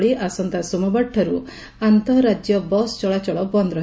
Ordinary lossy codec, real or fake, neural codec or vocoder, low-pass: none; real; none; none